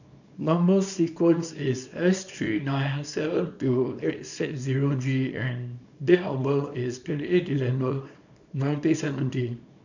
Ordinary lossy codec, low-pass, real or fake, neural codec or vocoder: none; 7.2 kHz; fake; codec, 24 kHz, 0.9 kbps, WavTokenizer, small release